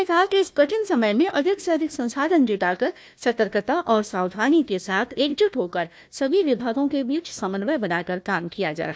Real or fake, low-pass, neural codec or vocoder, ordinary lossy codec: fake; none; codec, 16 kHz, 1 kbps, FunCodec, trained on Chinese and English, 50 frames a second; none